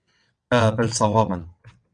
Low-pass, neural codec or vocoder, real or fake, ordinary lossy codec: 9.9 kHz; vocoder, 22.05 kHz, 80 mel bands, WaveNeXt; fake; MP3, 96 kbps